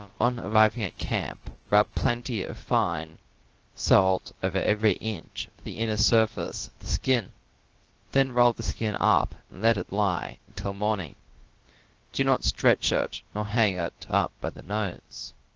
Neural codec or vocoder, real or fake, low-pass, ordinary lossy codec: codec, 16 kHz, about 1 kbps, DyCAST, with the encoder's durations; fake; 7.2 kHz; Opus, 16 kbps